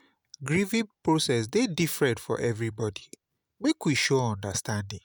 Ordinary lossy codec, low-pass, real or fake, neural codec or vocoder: none; none; real; none